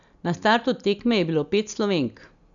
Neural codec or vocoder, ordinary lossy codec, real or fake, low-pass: none; none; real; 7.2 kHz